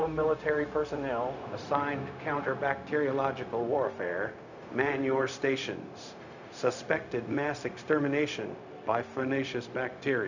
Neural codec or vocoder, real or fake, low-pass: codec, 16 kHz, 0.4 kbps, LongCat-Audio-Codec; fake; 7.2 kHz